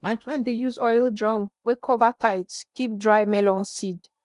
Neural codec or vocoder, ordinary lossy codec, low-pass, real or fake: codec, 16 kHz in and 24 kHz out, 0.8 kbps, FocalCodec, streaming, 65536 codes; none; 10.8 kHz; fake